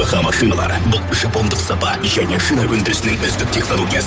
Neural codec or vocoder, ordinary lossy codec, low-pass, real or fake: codec, 16 kHz, 8 kbps, FunCodec, trained on Chinese and English, 25 frames a second; none; none; fake